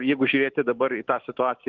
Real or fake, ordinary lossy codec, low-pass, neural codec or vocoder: fake; Opus, 16 kbps; 7.2 kHz; autoencoder, 48 kHz, 128 numbers a frame, DAC-VAE, trained on Japanese speech